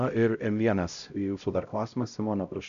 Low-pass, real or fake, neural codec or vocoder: 7.2 kHz; fake; codec, 16 kHz, 0.5 kbps, X-Codec, HuBERT features, trained on LibriSpeech